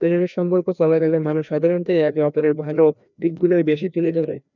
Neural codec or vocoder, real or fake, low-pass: codec, 16 kHz, 1 kbps, FreqCodec, larger model; fake; 7.2 kHz